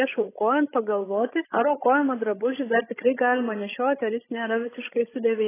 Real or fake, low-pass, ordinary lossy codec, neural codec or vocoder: fake; 3.6 kHz; AAC, 16 kbps; codec, 16 kHz, 16 kbps, FreqCodec, larger model